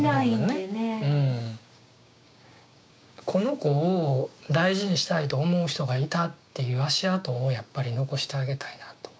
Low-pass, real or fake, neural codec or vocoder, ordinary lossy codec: none; fake; codec, 16 kHz, 6 kbps, DAC; none